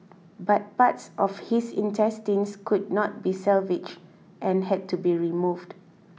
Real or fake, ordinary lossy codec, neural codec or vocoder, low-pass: real; none; none; none